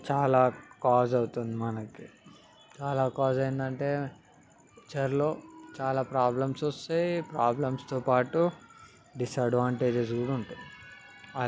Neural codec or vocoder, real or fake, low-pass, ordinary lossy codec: none; real; none; none